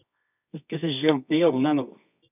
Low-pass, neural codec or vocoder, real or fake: 3.6 kHz; codec, 24 kHz, 0.9 kbps, WavTokenizer, medium music audio release; fake